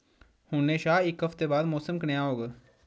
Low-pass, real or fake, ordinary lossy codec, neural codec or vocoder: none; real; none; none